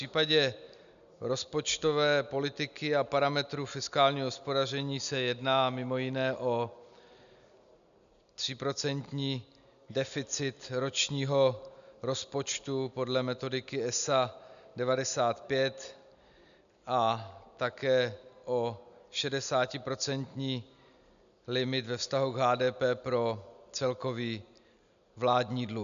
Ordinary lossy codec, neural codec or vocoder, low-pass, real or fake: MP3, 96 kbps; none; 7.2 kHz; real